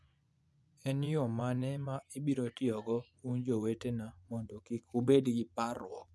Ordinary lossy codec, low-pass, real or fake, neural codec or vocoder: none; none; fake; vocoder, 24 kHz, 100 mel bands, Vocos